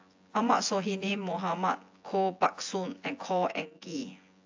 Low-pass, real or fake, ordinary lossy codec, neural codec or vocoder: 7.2 kHz; fake; AAC, 48 kbps; vocoder, 24 kHz, 100 mel bands, Vocos